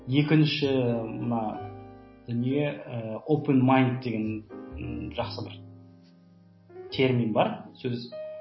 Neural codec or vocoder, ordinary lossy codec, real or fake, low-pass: none; MP3, 24 kbps; real; 7.2 kHz